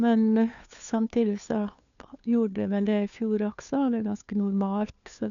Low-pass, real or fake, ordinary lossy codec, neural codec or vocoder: 7.2 kHz; fake; none; codec, 16 kHz, 2 kbps, FunCodec, trained on Chinese and English, 25 frames a second